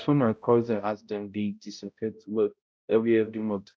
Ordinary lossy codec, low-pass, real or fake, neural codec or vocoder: none; none; fake; codec, 16 kHz, 0.5 kbps, X-Codec, HuBERT features, trained on balanced general audio